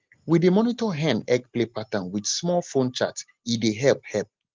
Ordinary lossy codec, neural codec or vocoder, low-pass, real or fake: Opus, 32 kbps; none; 7.2 kHz; real